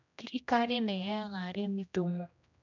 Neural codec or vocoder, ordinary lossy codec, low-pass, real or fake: codec, 16 kHz, 1 kbps, X-Codec, HuBERT features, trained on general audio; none; 7.2 kHz; fake